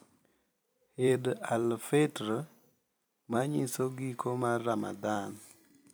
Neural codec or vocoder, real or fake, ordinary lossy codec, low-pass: vocoder, 44.1 kHz, 128 mel bands every 256 samples, BigVGAN v2; fake; none; none